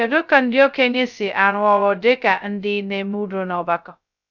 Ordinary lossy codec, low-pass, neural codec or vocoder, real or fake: none; 7.2 kHz; codec, 16 kHz, 0.2 kbps, FocalCodec; fake